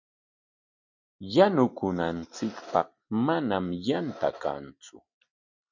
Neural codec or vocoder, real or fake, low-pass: none; real; 7.2 kHz